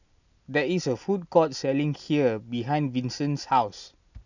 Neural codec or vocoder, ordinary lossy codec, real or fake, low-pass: none; none; real; 7.2 kHz